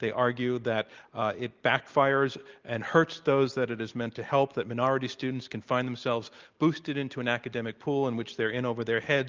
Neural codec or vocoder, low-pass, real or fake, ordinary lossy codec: none; 7.2 kHz; real; Opus, 24 kbps